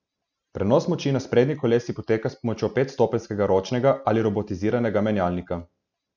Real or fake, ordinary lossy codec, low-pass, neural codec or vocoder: real; none; 7.2 kHz; none